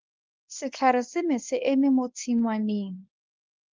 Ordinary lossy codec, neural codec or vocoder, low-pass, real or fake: Opus, 24 kbps; codec, 24 kHz, 0.9 kbps, WavTokenizer, medium speech release version 1; 7.2 kHz; fake